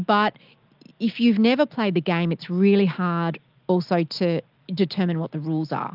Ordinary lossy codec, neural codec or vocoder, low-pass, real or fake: Opus, 24 kbps; none; 5.4 kHz; real